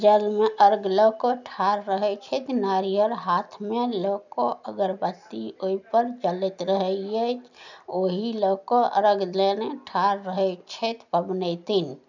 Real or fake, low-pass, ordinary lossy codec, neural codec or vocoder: real; 7.2 kHz; none; none